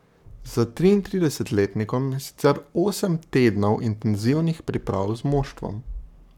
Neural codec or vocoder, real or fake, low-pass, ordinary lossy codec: codec, 44.1 kHz, 7.8 kbps, Pupu-Codec; fake; 19.8 kHz; none